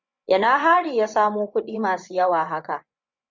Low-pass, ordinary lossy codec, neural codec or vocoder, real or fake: 7.2 kHz; MP3, 64 kbps; vocoder, 24 kHz, 100 mel bands, Vocos; fake